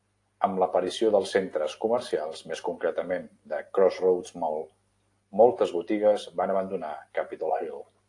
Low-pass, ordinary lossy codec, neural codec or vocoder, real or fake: 10.8 kHz; AAC, 48 kbps; none; real